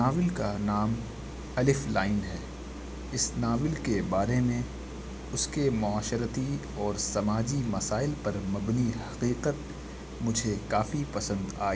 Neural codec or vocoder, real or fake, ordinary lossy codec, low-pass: none; real; none; none